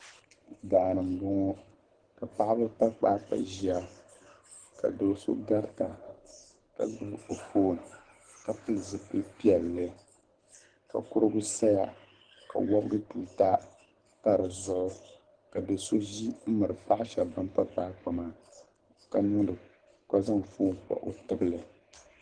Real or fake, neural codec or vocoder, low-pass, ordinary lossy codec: fake; codec, 24 kHz, 6 kbps, HILCodec; 9.9 kHz; Opus, 16 kbps